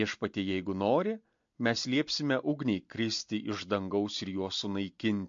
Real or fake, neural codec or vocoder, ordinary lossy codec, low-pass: real; none; MP3, 48 kbps; 7.2 kHz